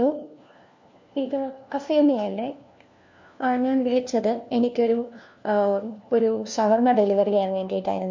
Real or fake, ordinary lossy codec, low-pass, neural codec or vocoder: fake; MP3, 48 kbps; 7.2 kHz; codec, 16 kHz, 1 kbps, FunCodec, trained on LibriTTS, 50 frames a second